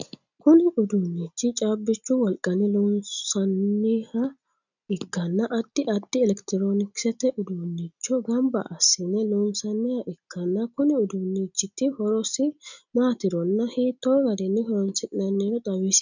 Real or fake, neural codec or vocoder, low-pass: real; none; 7.2 kHz